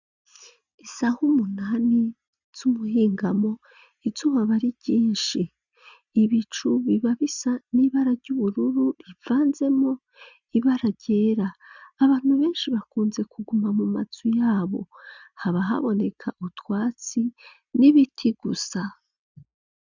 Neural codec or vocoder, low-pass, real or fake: none; 7.2 kHz; real